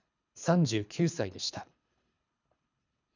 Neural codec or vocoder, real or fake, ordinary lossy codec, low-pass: codec, 24 kHz, 3 kbps, HILCodec; fake; none; 7.2 kHz